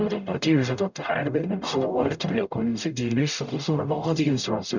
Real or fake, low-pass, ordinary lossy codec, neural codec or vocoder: fake; 7.2 kHz; none; codec, 44.1 kHz, 0.9 kbps, DAC